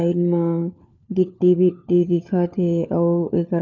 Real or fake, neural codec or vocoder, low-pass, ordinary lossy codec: fake; codec, 16 kHz, 4 kbps, FunCodec, trained on LibriTTS, 50 frames a second; 7.2 kHz; none